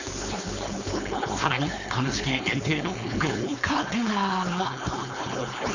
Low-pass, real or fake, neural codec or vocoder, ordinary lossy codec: 7.2 kHz; fake; codec, 16 kHz, 4.8 kbps, FACodec; none